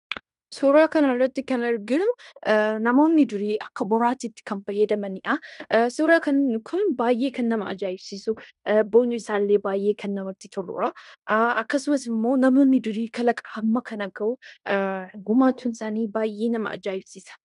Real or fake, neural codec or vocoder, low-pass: fake; codec, 16 kHz in and 24 kHz out, 0.9 kbps, LongCat-Audio-Codec, fine tuned four codebook decoder; 10.8 kHz